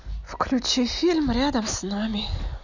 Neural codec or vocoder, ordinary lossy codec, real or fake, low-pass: none; none; real; 7.2 kHz